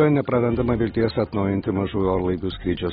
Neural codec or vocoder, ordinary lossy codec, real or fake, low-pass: none; AAC, 16 kbps; real; 19.8 kHz